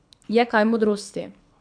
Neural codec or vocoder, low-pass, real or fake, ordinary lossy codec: codec, 24 kHz, 6 kbps, HILCodec; 9.9 kHz; fake; MP3, 96 kbps